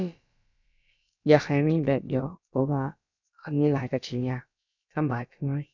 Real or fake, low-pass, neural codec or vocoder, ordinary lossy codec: fake; 7.2 kHz; codec, 16 kHz, about 1 kbps, DyCAST, with the encoder's durations; none